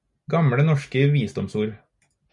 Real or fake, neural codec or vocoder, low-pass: real; none; 10.8 kHz